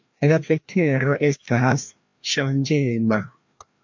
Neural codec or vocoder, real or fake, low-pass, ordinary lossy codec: codec, 16 kHz, 1 kbps, FreqCodec, larger model; fake; 7.2 kHz; MP3, 48 kbps